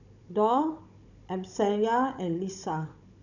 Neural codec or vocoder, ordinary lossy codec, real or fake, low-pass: codec, 16 kHz, 16 kbps, FunCodec, trained on Chinese and English, 50 frames a second; none; fake; 7.2 kHz